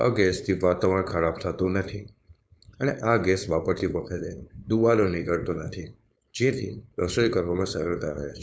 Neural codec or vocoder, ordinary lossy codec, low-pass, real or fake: codec, 16 kHz, 4.8 kbps, FACodec; none; none; fake